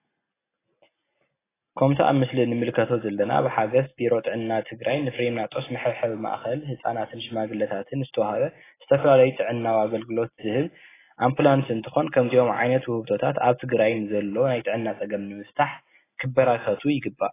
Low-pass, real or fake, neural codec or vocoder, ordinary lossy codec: 3.6 kHz; real; none; AAC, 16 kbps